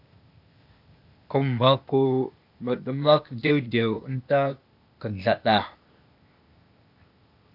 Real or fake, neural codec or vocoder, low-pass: fake; codec, 16 kHz, 0.8 kbps, ZipCodec; 5.4 kHz